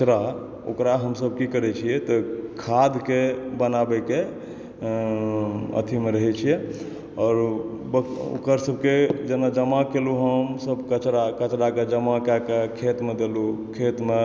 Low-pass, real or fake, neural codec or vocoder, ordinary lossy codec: none; real; none; none